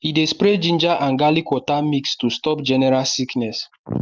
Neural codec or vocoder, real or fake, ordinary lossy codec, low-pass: none; real; Opus, 32 kbps; 7.2 kHz